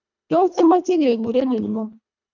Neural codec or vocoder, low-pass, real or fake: codec, 24 kHz, 1.5 kbps, HILCodec; 7.2 kHz; fake